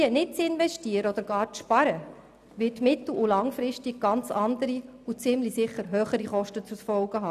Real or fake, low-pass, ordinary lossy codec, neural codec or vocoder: real; 14.4 kHz; none; none